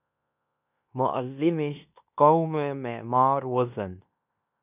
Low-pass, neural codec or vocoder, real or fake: 3.6 kHz; codec, 16 kHz in and 24 kHz out, 0.9 kbps, LongCat-Audio-Codec, fine tuned four codebook decoder; fake